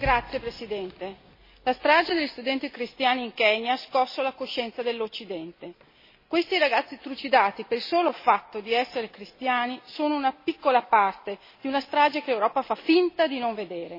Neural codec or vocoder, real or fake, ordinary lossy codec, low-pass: none; real; MP3, 24 kbps; 5.4 kHz